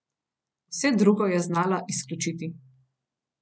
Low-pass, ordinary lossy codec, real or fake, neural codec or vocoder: none; none; real; none